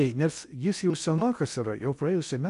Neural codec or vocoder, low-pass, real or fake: codec, 16 kHz in and 24 kHz out, 0.6 kbps, FocalCodec, streaming, 2048 codes; 10.8 kHz; fake